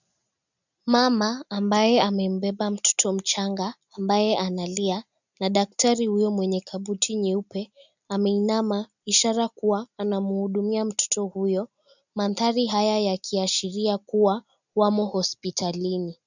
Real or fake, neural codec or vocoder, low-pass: real; none; 7.2 kHz